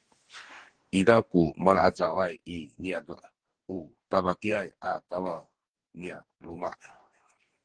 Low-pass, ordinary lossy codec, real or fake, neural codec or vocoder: 9.9 kHz; Opus, 16 kbps; fake; codec, 44.1 kHz, 2.6 kbps, DAC